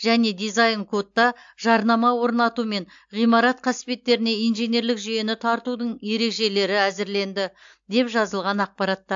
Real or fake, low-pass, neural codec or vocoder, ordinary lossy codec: real; 7.2 kHz; none; AAC, 64 kbps